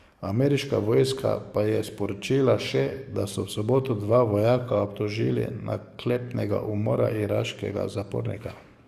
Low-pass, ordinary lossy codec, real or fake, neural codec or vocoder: 14.4 kHz; Opus, 64 kbps; fake; codec, 44.1 kHz, 7.8 kbps, DAC